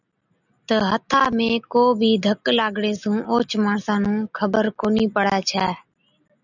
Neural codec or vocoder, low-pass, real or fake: none; 7.2 kHz; real